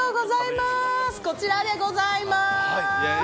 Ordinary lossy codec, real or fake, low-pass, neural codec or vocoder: none; real; none; none